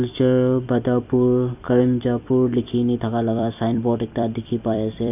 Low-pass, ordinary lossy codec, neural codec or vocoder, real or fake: 3.6 kHz; none; vocoder, 44.1 kHz, 128 mel bands every 256 samples, BigVGAN v2; fake